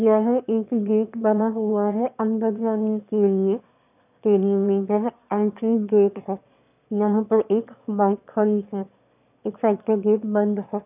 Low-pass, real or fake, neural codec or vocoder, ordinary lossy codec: 3.6 kHz; fake; autoencoder, 22.05 kHz, a latent of 192 numbers a frame, VITS, trained on one speaker; none